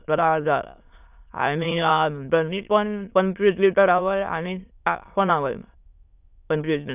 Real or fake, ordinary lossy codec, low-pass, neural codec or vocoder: fake; none; 3.6 kHz; autoencoder, 22.05 kHz, a latent of 192 numbers a frame, VITS, trained on many speakers